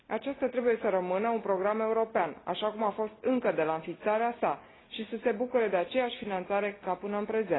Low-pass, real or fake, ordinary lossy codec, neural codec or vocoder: 7.2 kHz; real; AAC, 16 kbps; none